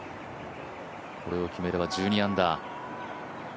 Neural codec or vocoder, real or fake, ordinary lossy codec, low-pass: none; real; none; none